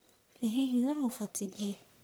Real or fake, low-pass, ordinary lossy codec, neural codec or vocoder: fake; none; none; codec, 44.1 kHz, 1.7 kbps, Pupu-Codec